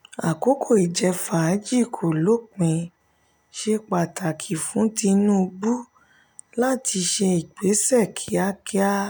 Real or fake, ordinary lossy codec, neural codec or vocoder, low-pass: real; none; none; none